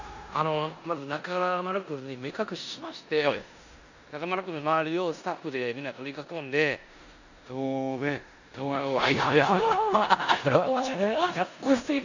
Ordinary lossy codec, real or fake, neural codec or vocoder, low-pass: none; fake; codec, 16 kHz in and 24 kHz out, 0.9 kbps, LongCat-Audio-Codec, four codebook decoder; 7.2 kHz